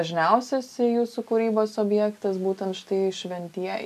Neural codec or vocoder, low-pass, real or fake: none; 14.4 kHz; real